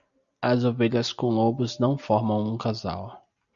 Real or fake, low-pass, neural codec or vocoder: real; 7.2 kHz; none